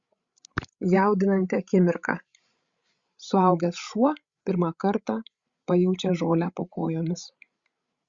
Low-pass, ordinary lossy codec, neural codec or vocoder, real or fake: 7.2 kHz; Opus, 64 kbps; codec, 16 kHz, 16 kbps, FreqCodec, larger model; fake